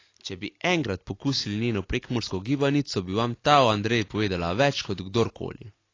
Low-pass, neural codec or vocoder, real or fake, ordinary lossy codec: 7.2 kHz; none; real; AAC, 32 kbps